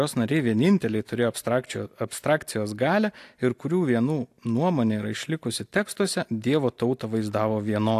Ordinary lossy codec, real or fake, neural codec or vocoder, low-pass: AAC, 64 kbps; real; none; 14.4 kHz